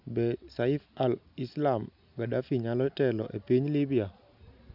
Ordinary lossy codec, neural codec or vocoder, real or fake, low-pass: none; none; real; 5.4 kHz